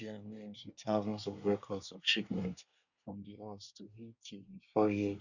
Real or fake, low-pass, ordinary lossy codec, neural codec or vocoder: fake; 7.2 kHz; none; codec, 24 kHz, 1 kbps, SNAC